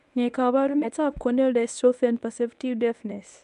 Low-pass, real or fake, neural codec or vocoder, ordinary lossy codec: 10.8 kHz; fake; codec, 24 kHz, 0.9 kbps, WavTokenizer, medium speech release version 1; none